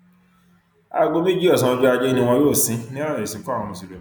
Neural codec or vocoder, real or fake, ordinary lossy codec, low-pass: none; real; none; 19.8 kHz